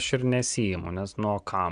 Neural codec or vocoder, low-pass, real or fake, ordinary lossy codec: none; 9.9 kHz; real; Opus, 64 kbps